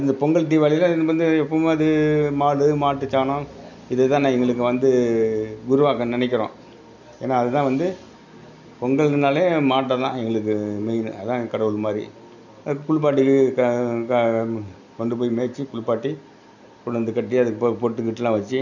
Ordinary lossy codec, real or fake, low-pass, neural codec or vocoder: none; real; 7.2 kHz; none